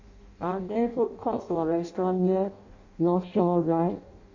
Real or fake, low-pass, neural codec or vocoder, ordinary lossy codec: fake; 7.2 kHz; codec, 16 kHz in and 24 kHz out, 0.6 kbps, FireRedTTS-2 codec; AAC, 48 kbps